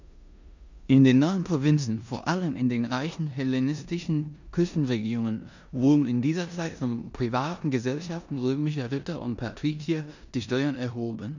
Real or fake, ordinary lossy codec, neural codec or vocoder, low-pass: fake; none; codec, 16 kHz in and 24 kHz out, 0.9 kbps, LongCat-Audio-Codec, four codebook decoder; 7.2 kHz